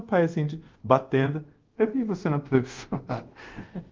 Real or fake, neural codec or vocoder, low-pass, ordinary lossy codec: fake; codec, 24 kHz, 0.5 kbps, DualCodec; 7.2 kHz; Opus, 24 kbps